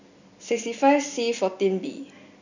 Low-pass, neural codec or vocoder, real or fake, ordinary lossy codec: 7.2 kHz; none; real; none